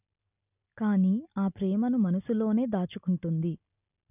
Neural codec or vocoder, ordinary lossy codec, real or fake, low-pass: none; none; real; 3.6 kHz